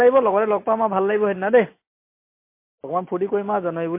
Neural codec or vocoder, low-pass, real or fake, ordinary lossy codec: none; 3.6 kHz; real; MP3, 24 kbps